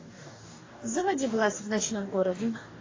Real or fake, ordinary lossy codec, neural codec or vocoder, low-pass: fake; AAC, 32 kbps; codec, 44.1 kHz, 2.6 kbps, DAC; 7.2 kHz